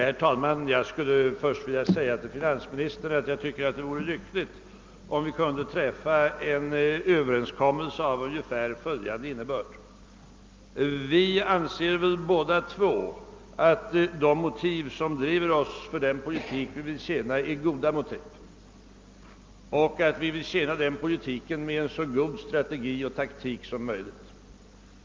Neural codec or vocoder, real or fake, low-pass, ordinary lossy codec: none; real; 7.2 kHz; Opus, 24 kbps